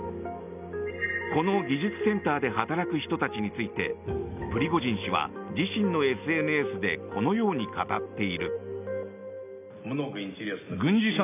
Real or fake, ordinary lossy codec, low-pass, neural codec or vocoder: real; none; 3.6 kHz; none